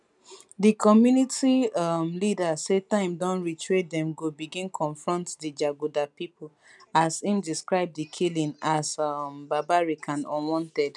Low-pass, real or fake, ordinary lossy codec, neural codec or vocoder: 10.8 kHz; real; none; none